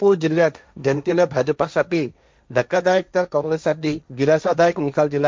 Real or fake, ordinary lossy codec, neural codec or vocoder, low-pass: fake; none; codec, 16 kHz, 1.1 kbps, Voila-Tokenizer; none